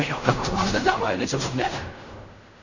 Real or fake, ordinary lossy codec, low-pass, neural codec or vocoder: fake; none; 7.2 kHz; codec, 16 kHz in and 24 kHz out, 0.4 kbps, LongCat-Audio-Codec, fine tuned four codebook decoder